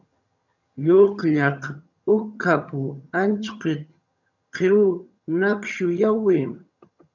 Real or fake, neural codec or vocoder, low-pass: fake; vocoder, 22.05 kHz, 80 mel bands, HiFi-GAN; 7.2 kHz